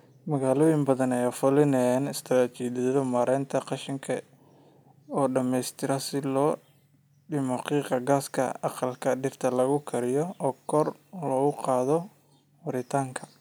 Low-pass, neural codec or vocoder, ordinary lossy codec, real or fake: none; none; none; real